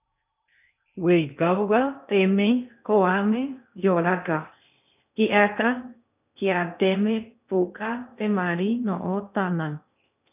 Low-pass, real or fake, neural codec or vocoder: 3.6 kHz; fake; codec, 16 kHz in and 24 kHz out, 0.6 kbps, FocalCodec, streaming, 2048 codes